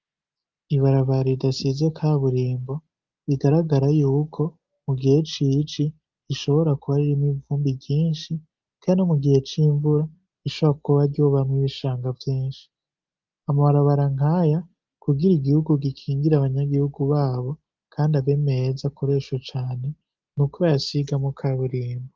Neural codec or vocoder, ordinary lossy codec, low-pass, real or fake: none; Opus, 32 kbps; 7.2 kHz; real